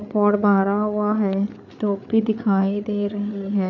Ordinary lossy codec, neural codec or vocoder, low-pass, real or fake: none; codec, 16 kHz, 8 kbps, FreqCodec, larger model; 7.2 kHz; fake